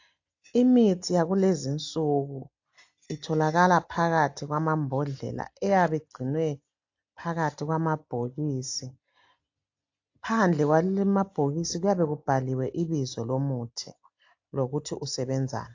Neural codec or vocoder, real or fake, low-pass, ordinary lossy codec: none; real; 7.2 kHz; AAC, 48 kbps